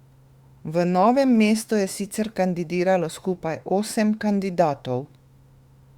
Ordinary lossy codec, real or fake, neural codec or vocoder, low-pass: Opus, 64 kbps; fake; autoencoder, 48 kHz, 32 numbers a frame, DAC-VAE, trained on Japanese speech; 19.8 kHz